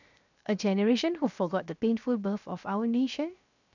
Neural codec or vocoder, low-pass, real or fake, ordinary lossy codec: codec, 16 kHz, 0.7 kbps, FocalCodec; 7.2 kHz; fake; none